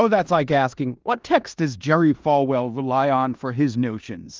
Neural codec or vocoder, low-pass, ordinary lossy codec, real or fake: codec, 16 kHz in and 24 kHz out, 0.9 kbps, LongCat-Audio-Codec, four codebook decoder; 7.2 kHz; Opus, 24 kbps; fake